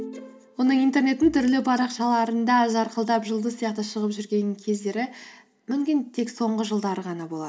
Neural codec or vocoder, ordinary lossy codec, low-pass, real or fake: none; none; none; real